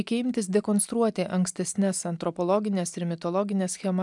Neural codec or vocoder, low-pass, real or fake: none; 10.8 kHz; real